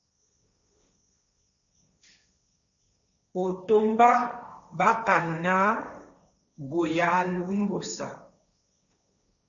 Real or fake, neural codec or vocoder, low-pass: fake; codec, 16 kHz, 1.1 kbps, Voila-Tokenizer; 7.2 kHz